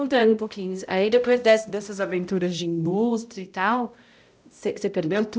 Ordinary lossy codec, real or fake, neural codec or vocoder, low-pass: none; fake; codec, 16 kHz, 0.5 kbps, X-Codec, HuBERT features, trained on balanced general audio; none